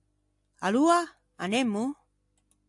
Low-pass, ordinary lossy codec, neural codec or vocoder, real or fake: 10.8 kHz; AAC, 64 kbps; none; real